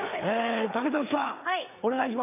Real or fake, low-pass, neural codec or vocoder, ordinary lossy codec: fake; 3.6 kHz; codec, 16 kHz, 4 kbps, FreqCodec, larger model; none